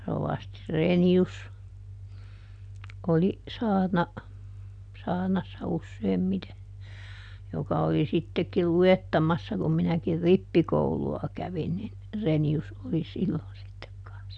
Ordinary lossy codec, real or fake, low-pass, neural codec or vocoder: none; real; 9.9 kHz; none